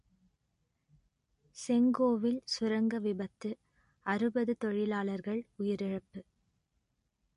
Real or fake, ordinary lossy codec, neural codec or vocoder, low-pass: real; MP3, 48 kbps; none; 10.8 kHz